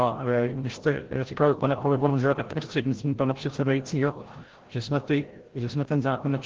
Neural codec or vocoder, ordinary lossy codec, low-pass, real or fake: codec, 16 kHz, 0.5 kbps, FreqCodec, larger model; Opus, 16 kbps; 7.2 kHz; fake